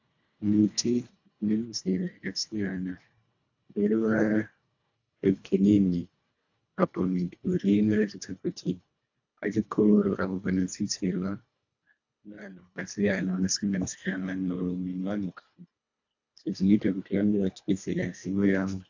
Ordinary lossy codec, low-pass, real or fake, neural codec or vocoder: AAC, 48 kbps; 7.2 kHz; fake; codec, 24 kHz, 1.5 kbps, HILCodec